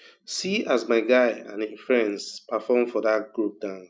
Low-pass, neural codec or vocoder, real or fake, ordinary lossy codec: none; none; real; none